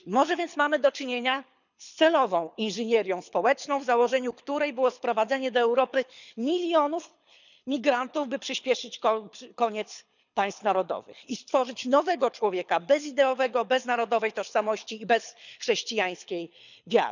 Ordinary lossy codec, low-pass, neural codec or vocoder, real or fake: none; 7.2 kHz; codec, 24 kHz, 6 kbps, HILCodec; fake